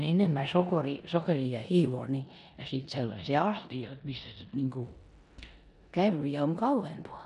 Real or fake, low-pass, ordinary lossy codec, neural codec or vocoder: fake; 10.8 kHz; none; codec, 16 kHz in and 24 kHz out, 0.9 kbps, LongCat-Audio-Codec, four codebook decoder